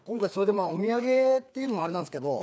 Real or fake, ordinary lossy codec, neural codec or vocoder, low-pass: fake; none; codec, 16 kHz, 2 kbps, FreqCodec, larger model; none